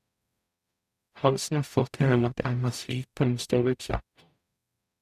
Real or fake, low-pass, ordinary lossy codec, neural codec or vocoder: fake; 14.4 kHz; none; codec, 44.1 kHz, 0.9 kbps, DAC